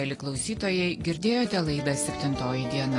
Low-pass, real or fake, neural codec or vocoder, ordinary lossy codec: 10.8 kHz; real; none; AAC, 32 kbps